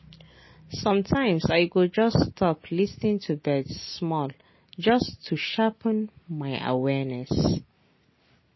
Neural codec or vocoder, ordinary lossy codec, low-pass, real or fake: none; MP3, 24 kbps; 7.2 kHz; real